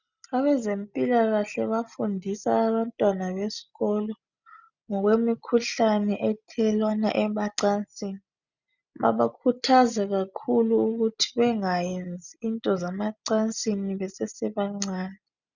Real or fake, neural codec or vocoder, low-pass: real; none; 7.2 kHz